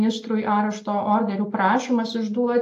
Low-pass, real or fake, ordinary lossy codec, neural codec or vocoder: 14.4 kHz; real; AAC, 48 kbps; none